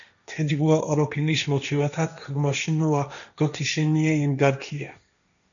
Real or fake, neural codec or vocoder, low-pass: fake; codec, 16 kHz, 1.1 kbps, Voila-Tokenizer; 7.2 kHz